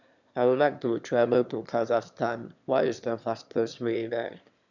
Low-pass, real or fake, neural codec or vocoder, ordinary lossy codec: 7.2 kHz; fake; autoencoder, 22.05 kHz, a latent of 192 numbers a frame, VITS, trained on one speaker; none